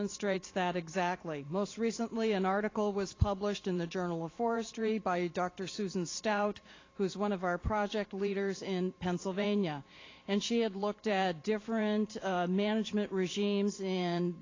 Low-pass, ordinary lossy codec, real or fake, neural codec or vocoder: 7.2 kHz; AAC, 32 kbps; fake; vocoder, 44.1 kHz, 128 mel bands every 512 samples, BigVGAN v2